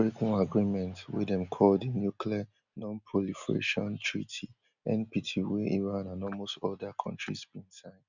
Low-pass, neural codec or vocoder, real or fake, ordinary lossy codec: 7.2 kHz; none; real; none